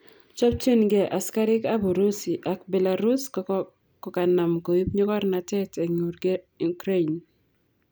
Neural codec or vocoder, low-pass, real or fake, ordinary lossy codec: none; none; real; none